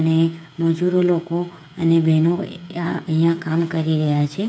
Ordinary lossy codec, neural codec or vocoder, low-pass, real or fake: none; codec, 16 kHz, 8 kbps, FreqCodec, smaller model; none; fake